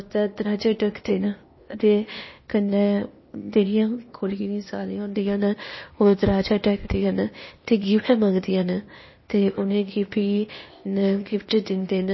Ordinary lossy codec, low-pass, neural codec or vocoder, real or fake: MP3, 24 kbps; 7.2 kHz; codec, 16 kHz, 0.8 kbps, ZipCodec; fake